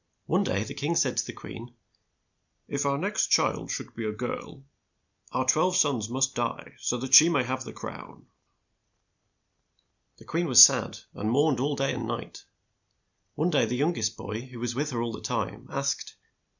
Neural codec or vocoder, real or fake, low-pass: none; real; 7.2 kHz